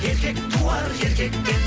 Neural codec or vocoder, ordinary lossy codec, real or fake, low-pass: none; none; real; none